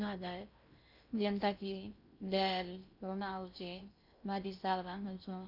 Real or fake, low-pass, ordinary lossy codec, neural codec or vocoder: fake; 5.4 kHz; none; codec, 16 kHz in and 24 kHz out, 0.6 kbps, FocalCodec, streaming, 2048 codes